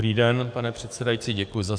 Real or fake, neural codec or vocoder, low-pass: fake; codec, 44.1 kHz, 7.8 kbps, DAC; 9.9 kHz